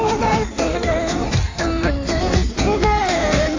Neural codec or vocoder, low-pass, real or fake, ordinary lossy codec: codec, 16 kHz in and 24 kHz out, 1.1 kbps, FireRedTTS-2 codec; 7.2 kHz; fake; AAC, 48 kbps